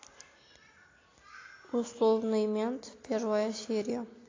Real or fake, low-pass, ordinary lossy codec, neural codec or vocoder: real; 7.2 kHz; AAC, 32 kbps; none